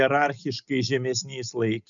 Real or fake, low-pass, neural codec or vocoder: real; 7.2 kHz; none